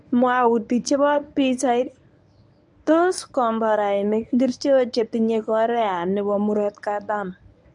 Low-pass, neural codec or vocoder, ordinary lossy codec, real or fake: 10.8 kHz; codec, 24 kHz, 0.9 kbps, WavTokenizer, medium speech release version 1; none; fake